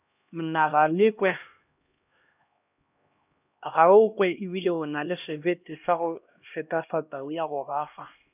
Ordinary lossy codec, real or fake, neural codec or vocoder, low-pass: none; fake; codec, 16 kHz, 1 kbps, X-Codec, HuBERT features, trained on LibriSpeech; 3.6 kHz